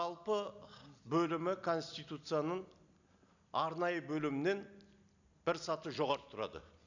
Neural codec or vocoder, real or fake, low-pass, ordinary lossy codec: none; real; 7.2 kHz; none